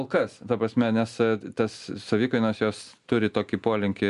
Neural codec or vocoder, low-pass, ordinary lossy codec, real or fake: none; 10.8 kHz; MP3, 96 kbps; real